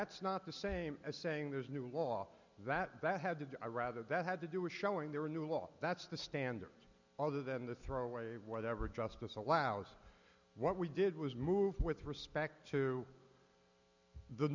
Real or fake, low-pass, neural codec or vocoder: real; 7.2 kHz; none